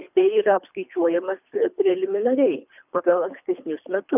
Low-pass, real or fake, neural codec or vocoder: 3.6 kHz; fake; codec, 24 kHz, 3 kbps, HILCodec